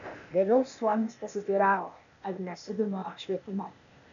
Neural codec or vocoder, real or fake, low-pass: codec, 16 kHz, 0.8 kbps, ZipCodec; fake; 7.2 kHz